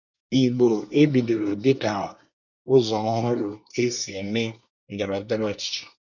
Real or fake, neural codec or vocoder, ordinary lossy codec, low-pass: fake; codec, 24 kHz, 1 kbps, SNAC; none; 7.2 kHz